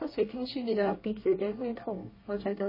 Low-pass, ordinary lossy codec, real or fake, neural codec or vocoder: 5.4 kHz; MP3, 24 kbps; fake; codec, 24 kHz, 1 kbps, SNAC